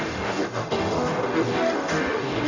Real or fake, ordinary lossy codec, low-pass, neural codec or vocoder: fake; none; 7.2 kHz; codec, 44.1 kHz, 0.9 kbps, DAC